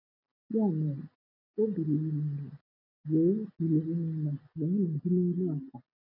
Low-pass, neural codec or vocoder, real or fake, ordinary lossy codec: 5.4 kHz; none; real; none